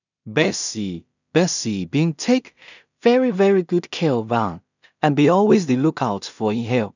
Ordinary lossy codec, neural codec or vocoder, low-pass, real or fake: none; codec, 16 kHz in and 24 kHz out, 0.4 kbps, LongCat-Audio-Codec, two codebook decoder; 7.2 kHz; fake